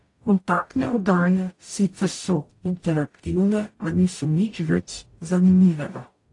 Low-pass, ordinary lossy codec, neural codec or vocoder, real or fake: 10.8 kHz; AAC, 48 kbps; codec, 44.1 kHz, 0.9 kbps, DAC; fake